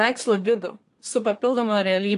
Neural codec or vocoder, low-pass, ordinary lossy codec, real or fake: codec, 24 kHz, 1 kbps, SNAC; 10.8 kHz; AAC, 48 kbps; fake